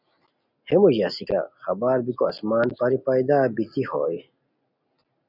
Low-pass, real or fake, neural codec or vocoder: 5.4 kHz; real; none